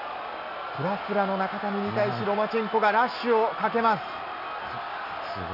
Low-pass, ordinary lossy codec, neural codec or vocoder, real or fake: 5.4 kHz; none; none; real